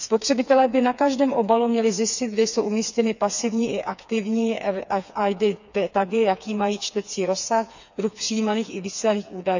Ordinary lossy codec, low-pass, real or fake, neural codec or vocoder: none; 7.2 kHz; fake; codec, 16 kHz, 4 kbps, FreqCodec, smaller model